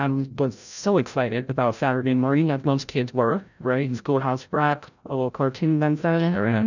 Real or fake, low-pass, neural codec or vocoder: fake; 7.2 kHz; codec, 16 kHz, 0.5 kbps, FreqCodec, larger model